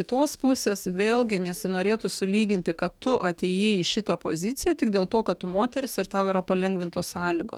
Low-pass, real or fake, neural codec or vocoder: 19.8 kHz; fake; codec, 44.1 kHz, 2.6 kbps, DAC